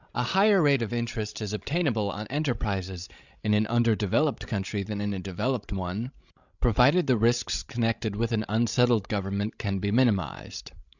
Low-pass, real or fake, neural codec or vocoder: 7.2 kHz; fake; codec, 16 kHz, 16 kbps, FreqCodec, larger model